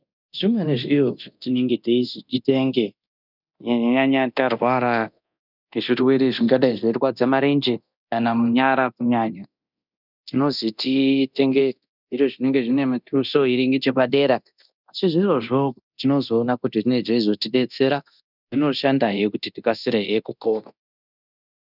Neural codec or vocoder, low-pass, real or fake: codec, 24 kHz, 0.9 kbps, DualCodec; 5.4 kHz; fake